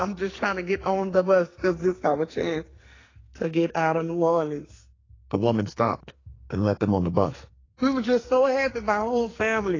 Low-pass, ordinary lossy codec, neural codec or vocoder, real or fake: 7.2 kHz; AAC, 32 kbps; codec, 44.1 kHz, 2.6 kbps, SNAC; fake